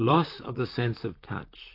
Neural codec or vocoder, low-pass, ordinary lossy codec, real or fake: vocoder, 44.1 kHz, 128 mel bands, Pupu-Vocoder; 5.4 kHz; AAC, 32 kbps; fake